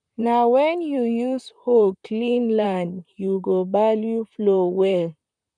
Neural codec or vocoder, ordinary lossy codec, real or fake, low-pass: vocoder, 44.1 kHz, 128 mel bands, Pupu-Vocoder; none; fake; 9.9 kHz